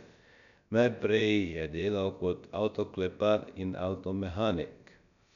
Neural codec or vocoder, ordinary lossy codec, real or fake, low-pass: codec, 16 kHz, about 1 kbps, DyCAST, with the encoder's durations; none; fake; 7.2 kHz